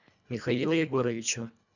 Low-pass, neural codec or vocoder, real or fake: 7.2 kHz; codec, 24 kHz, 1.5 kbps, HILCodec; fake